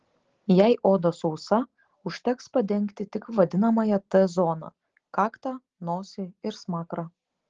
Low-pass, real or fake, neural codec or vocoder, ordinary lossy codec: 7.2 kHz; real; none; Opus, 16 kbps